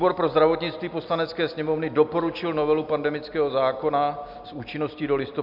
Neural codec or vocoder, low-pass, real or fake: none; 5.4 kHz; real